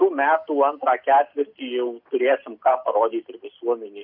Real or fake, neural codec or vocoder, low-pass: real; none; 5.4 kHz